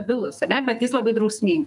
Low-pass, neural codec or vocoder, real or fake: 10.8 kHz; codec, 44.1 kHz, 2.6 kbps, SNAC; fake